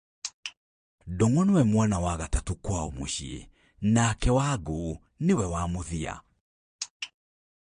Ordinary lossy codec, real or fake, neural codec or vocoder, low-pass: MP3, 48 kbps; fake; vocoder, 22.05 kHz, 80 mel bands, Vocos; 9.9 kHz